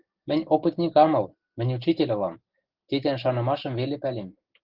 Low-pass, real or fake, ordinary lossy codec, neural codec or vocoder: 5.4 kHz; real; Opus, 16 kbps; none